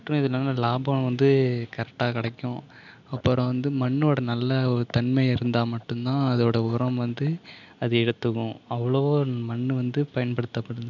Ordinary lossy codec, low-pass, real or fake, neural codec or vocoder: none; 7.2 kHz; real; none